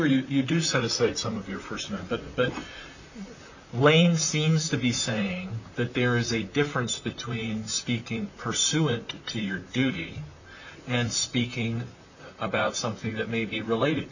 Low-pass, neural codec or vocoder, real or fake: 7.2 kHz; vocoder, 44.1 kHz, 128 mel bands, Pupu-Vocoder; fake